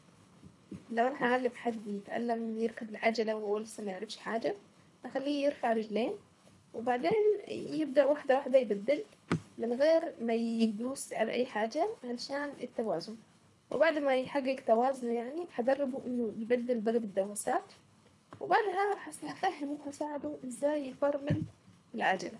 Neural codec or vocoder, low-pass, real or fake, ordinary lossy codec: codec, 24 kHz, 3 kbps, HILCodec; none; fake; none